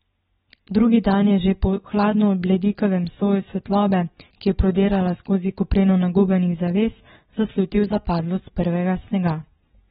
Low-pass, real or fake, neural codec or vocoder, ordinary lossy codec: 7.2 kHz; real; none; AAC, 16 kbps